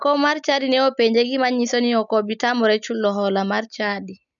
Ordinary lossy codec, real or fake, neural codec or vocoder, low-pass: none; real; none; 7.2 kHz